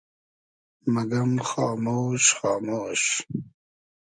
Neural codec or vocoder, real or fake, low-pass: none; real; 9.9 kHz